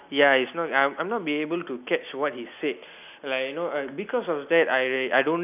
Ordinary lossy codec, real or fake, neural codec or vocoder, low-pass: none; real; none; 3.6 kHz